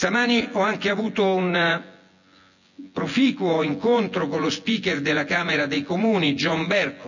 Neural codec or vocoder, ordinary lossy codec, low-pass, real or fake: vocoder, 24 kHz, 100 mel bands, Vocos; none; 7.2 kHz; fake